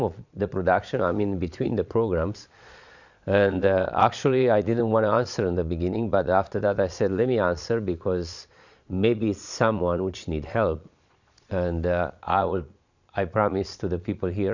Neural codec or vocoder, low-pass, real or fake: vocoder, 22.05 kHz, 80 mel bands, Vocos; 7.2 kHz; fake